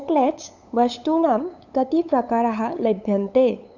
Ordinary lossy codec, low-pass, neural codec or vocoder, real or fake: none; 7.2 kHz; codec, 16 kHz, 8 kbps, FunCodec, trained on LibriTTS, 25 frames a second; fake